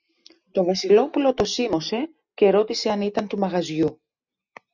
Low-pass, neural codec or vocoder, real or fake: 7.2 kHz; none; real